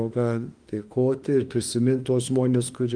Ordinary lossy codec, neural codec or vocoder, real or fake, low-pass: Opus, 24 kbps; autoencoder, 48 kHz, 32 numbers a frame, DAC-VAE, trained on Japanese speech; fake; 9.9 kHz